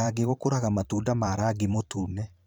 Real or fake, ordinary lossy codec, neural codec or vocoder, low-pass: fake; none; vocoder, 44.1 kHz, 128 mel bands every 512 samples, BigVGAN v2; none